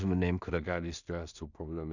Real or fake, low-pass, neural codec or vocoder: fake; 7.2 kHz; codec, 16 kHz in and 24 kHz out, 0.4 kbps, LongCat-Audio-Codec, two codebook decoder